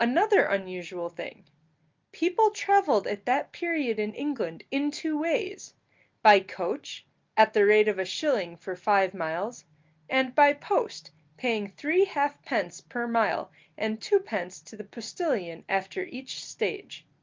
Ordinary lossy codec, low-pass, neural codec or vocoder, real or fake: Opus, 24 kbps; 7.2 kHz; none; real